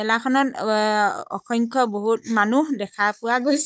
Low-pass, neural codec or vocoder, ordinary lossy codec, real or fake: none; codec, 16 kHz, 16 kbps, FunCodec, trained on Chinese and English, 50 frames a second; none; fake